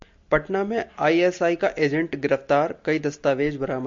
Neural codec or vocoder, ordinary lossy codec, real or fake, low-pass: none; MP3, 48 kbps; real; 7.2 kHz